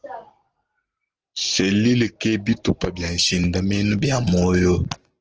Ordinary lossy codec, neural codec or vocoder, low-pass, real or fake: Opus, 16 kbps; none; 7.2 kHz; real